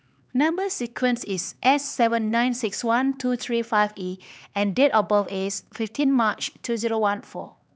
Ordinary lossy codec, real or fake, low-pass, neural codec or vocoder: none; fake; none; codec, 16 kHz, 4 kbps, X-Codec, HuBERT features, trained on LibriSpeech